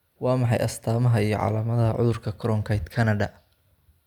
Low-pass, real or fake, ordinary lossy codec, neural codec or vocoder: 19.8 kHz; real; none; none